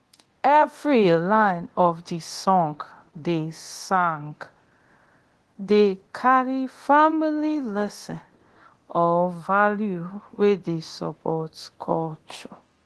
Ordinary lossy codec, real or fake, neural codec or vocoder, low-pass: Opus, 16 kbps; fake; codec, 24 kHz, 0.9 kbps, DualCodec; 10.8 kHz